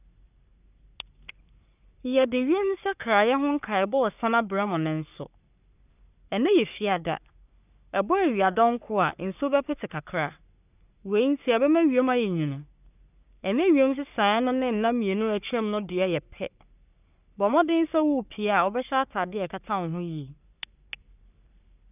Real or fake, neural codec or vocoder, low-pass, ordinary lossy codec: fake; codec, 44.1 kHz, 3.4 kbps, Pupu-Codec; 3.6 kHz; none